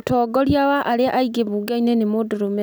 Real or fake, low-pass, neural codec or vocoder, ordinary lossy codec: real; none; none; none